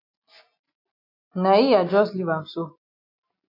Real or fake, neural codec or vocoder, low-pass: real; none; 5.4 kHz